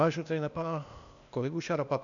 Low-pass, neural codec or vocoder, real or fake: 7.2 kHz; codec, 16 kHz, 0.8 kbps, ZipCodec; fake